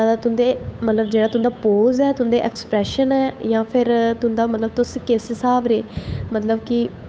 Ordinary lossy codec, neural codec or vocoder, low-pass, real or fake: none; codec, 16 kHz, 8 kbps, FunCodec, trained on Chinese and English, 25 frames a second; none; fake